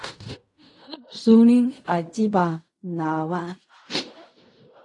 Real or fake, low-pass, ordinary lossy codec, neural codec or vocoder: fake; 10.8 kHz; AAC, 48 kbps; codec, 16 kHz in and 24 kHz out, 0.4 kbps, LongCat-Audio-Codec, fine tuned four codebook decoder